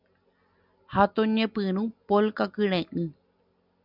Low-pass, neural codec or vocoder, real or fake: 5.4 kHz; none; real